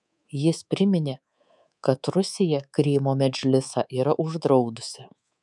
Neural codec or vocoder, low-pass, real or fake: codec, 24 kHz, 3.1 kbps, DualCodec; 10.8 kHz; fake